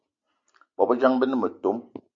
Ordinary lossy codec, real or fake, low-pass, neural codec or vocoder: Opus, 64 kbps; real; 7.2 kHz; none